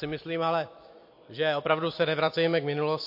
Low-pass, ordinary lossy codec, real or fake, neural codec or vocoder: 5.4 kHz; MP3, 32 kbps; fake; vocoder, 44.1 kHz, 128 mel bands every 256 samples, BigVGAN v2